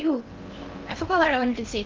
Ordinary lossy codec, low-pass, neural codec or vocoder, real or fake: Opus, 24 kbps; 7.2 kHz; codec, 16 kHz in and 24 kHz out, 0.6 kbps, FocalCodec, streaming, 4096 codes; fake